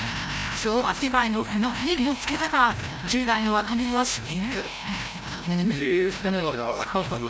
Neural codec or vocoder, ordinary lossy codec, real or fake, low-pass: codec, 16 kHz, 0.5 kbps, FreqCodec, larger model; none; fake; none